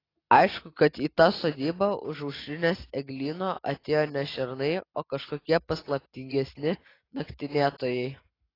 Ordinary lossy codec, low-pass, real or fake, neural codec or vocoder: AAC, 24 kbps; 5.4 kHz; real; none